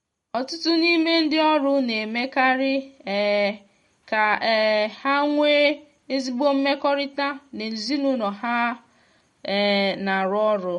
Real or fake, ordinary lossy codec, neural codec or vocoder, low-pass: real; MP3, 48 kbps; none; 19.8 kHz